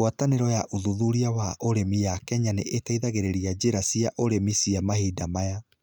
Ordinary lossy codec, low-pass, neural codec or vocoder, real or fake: none; none; none; real